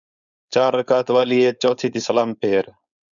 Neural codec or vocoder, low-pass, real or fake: codec, 16 kHz, 4.8 kbps, FACodec; 7.2 kHz; fake